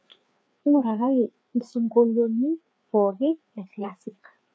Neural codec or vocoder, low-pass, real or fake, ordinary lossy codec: codec, 16 kHz, 4 kbps, FreqCodec, larger model; none; fake; none